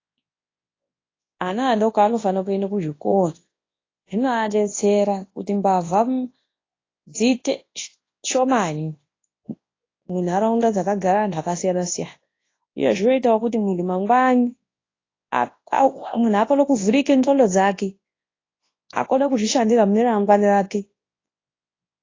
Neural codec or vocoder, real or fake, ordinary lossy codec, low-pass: codec, 24 kHz, 0.9 kbps, WavTokenizer, large speech release; fake; AAC, 32 kbps; 7.2 kHz